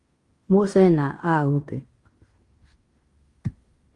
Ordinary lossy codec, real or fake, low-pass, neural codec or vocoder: Opus, 32 kbps; fake; 10.8 kHz; codec, 16 kHz in and 24 kHz out, 0.9 kbps, LongCat-Audio-Codec, fine tuned four codebook decoder